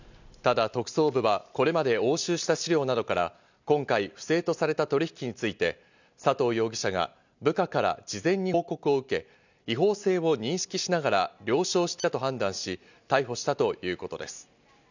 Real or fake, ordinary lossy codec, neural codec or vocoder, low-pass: real; none; none; 7.2 kHz